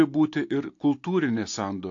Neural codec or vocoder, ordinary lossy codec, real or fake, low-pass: none; AAC, 48 kbps; real; 7.2 kHz